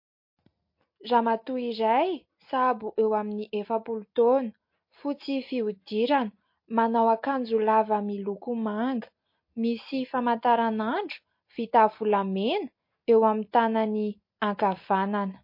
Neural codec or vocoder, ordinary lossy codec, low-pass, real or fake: none; MP3, 32 kbps; 5.4 kHz; real